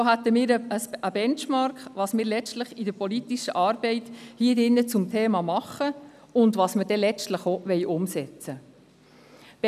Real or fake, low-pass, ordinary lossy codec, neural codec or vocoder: real; 14.4 kHz; none; none